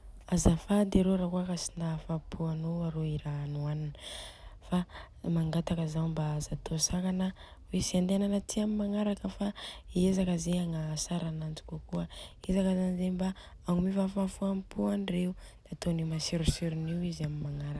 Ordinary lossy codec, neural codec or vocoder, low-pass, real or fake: none; none; none; real